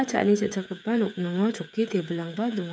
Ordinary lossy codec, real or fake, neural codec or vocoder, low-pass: none; fake; codec, 16 kHz, 8 kbps, FreqCodec, smaller model; none